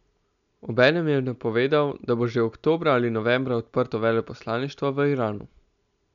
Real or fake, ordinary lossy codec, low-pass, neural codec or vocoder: real; none; 7.2 kHz; none